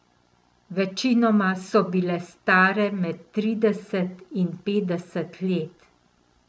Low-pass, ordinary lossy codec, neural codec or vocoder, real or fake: none; none; none; real